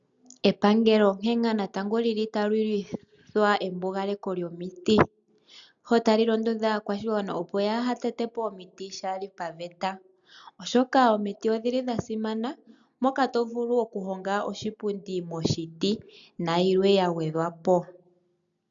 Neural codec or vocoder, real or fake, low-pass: none; real; 7.2 kHz